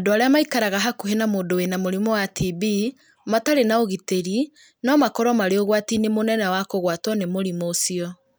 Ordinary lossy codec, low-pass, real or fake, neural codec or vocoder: none; none; real; none